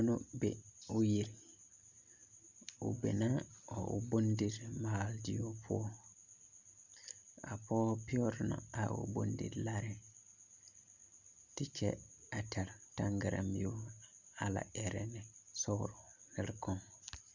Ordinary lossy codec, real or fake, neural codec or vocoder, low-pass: Opus, 64 kbps; real; none; 7.2 kHz